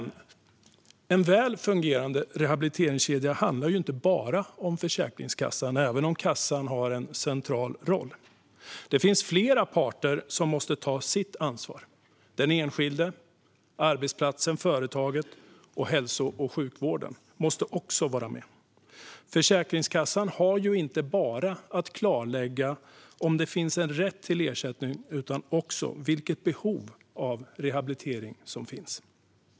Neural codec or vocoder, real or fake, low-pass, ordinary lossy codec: none; real; none; none